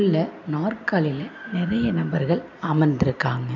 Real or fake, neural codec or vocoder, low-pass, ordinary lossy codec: real; none; 7.2 kHz; none